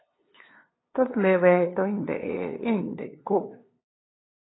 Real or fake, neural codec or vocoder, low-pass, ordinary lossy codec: fake; codec, 16 kHz, 2 kbps, FunCodec, trained on LibriTTS, 25 frames a second; 7.2 kHz; AAC, 16 kbps